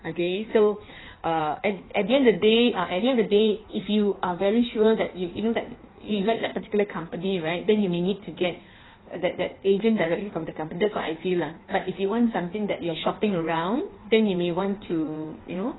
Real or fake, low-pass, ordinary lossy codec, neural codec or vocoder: fake; 7.2 kHz; AAC, 16 kbps; codec, 16 kHz in and 24 kHz out, 1.1 kbps, FireRedTTS-2 codec